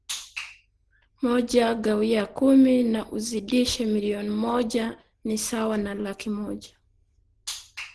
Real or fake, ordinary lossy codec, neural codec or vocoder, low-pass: real; Opus, 16 kbps; none; 10.8 kHz